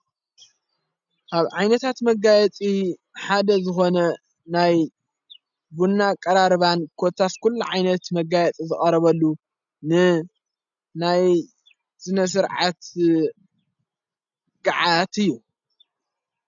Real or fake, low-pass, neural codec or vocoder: real; 7.2 kHz; none